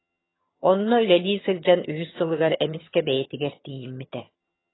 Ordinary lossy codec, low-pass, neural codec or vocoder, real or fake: AAC, 16 kbps; 7.2 kHz; vocoder, 22.05 kHz, 80 mel bands, HiFi-GAN; fake